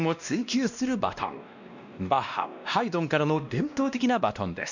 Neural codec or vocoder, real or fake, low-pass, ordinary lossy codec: codec, 16 kHz, 1 kbps, X-Codec, WavLM features, trained on Multilingual LibriSpeech; fake; 7.2 kHz; none